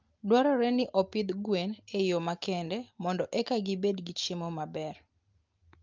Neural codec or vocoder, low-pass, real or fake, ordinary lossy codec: none; 7.2 kHz; real; Opus, 24 kbps